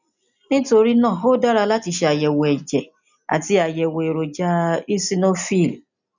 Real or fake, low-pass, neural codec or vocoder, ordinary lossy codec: real; 7.2 kHz; none; none